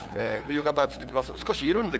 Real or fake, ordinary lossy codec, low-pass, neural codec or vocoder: fake; none; none; codec, 16 kHz, 2 kbps, FunCodec, trained on LibriTTS, 25 frames a second